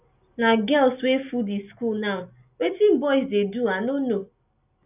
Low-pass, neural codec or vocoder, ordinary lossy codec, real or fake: 3.6 kHz; none; none; real